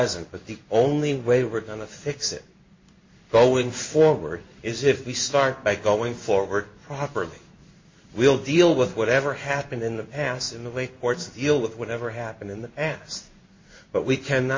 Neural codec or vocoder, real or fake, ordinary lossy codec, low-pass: codec, 16 kHz in and 24 kHz out, 1 kbps, XY-Tokenizer; fake; MP3, 32 kbps; 7.2 kHz